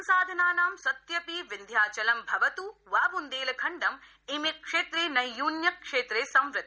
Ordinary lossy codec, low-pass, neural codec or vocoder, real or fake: none; none; none; real